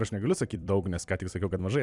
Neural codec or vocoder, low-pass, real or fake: vocoder, 44.1 kHz, 128 mel bands every 256 samples, BigVGAN v2; 10.8 kHz; fake